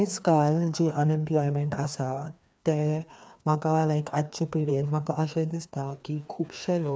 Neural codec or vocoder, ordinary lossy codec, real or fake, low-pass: codec, 16 kHz, 2 kbps, FreqCodec, larger model; none; fake; none